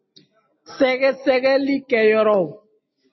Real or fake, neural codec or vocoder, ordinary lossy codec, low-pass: real; none; MP3, 24 kbps; 7.2 kHz